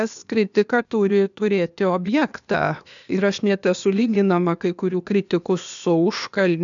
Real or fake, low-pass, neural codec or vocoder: fake; 7.2 kHz; codec, 16 kHz, 0.8 kbps, ZipCodec